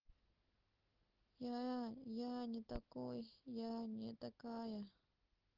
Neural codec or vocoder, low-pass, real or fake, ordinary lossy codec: none; 5.4 kHz; real; Opus, 16 kbps